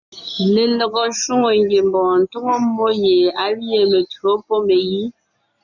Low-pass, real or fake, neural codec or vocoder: 7.2 kHz; real; none